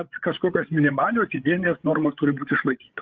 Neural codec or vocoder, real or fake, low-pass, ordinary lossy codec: codec, 16 kHz, 8 kbps, FreqCodec, larger model; fake; 7.2 kHz; Opus, 24 kbps